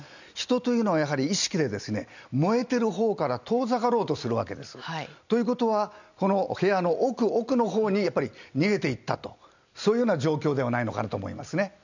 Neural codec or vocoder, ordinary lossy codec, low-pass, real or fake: none; none; 7.2 kHz; real